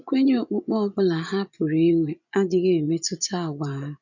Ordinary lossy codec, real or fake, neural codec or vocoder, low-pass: none; fake; vocoder, 24 kHz, 100 mel bands, Vocos; 7.2 kHz